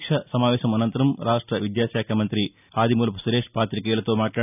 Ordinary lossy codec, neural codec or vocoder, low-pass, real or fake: none; none; 3.6 kHz; real